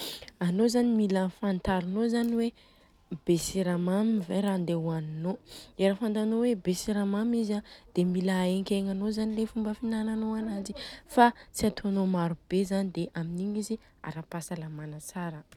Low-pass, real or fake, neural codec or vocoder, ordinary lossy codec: 19.8 kHz; real; none; none